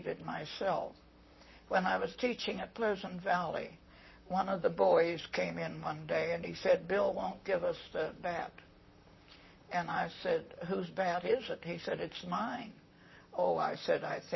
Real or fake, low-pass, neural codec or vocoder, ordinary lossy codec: fake; 7.2 kHz; vocoder, 44.1 kHz, 128 mel bands, Pupu-Vocoder; MP3, 24 kbps